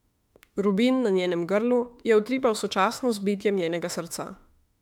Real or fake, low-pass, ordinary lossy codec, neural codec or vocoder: fake; 19.8 kHz; MP3, 96 kbps; autoencoder, 48 kHz, 32 numbers a frame, DAC-VAE, trained on Japanese speech